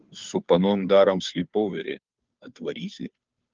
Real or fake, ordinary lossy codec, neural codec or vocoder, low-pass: fake; Opus, 24 kbps; codec, 16 kHz, 2 kbps, FunCodec, trained on Chinese and English, 25 frames a second; 7.2 kHz